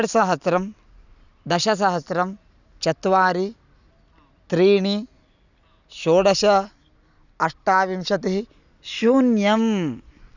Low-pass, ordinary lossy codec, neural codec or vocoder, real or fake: 7.2 kHz; none; none; real